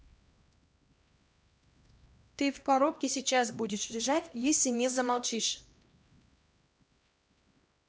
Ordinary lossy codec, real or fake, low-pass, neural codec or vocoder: none; fake; none; codec, 16 kHz, 1 kbps, X-Codec, HuBERT features, trained on LibriSpeech